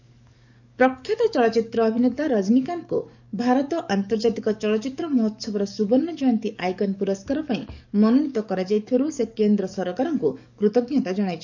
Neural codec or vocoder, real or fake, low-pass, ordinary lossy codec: codec, 44.1 kHz, 7.8 kbps, DAC; fake; 7.2 kHz; none